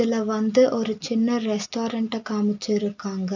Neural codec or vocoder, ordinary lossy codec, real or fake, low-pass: none; none; real; 7.2 kHz